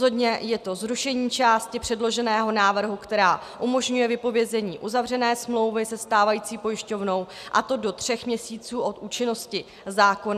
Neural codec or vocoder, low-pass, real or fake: none; 14.4 kHz; real